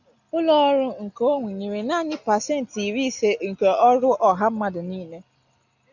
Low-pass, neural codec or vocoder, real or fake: 7.2 kHz; none; real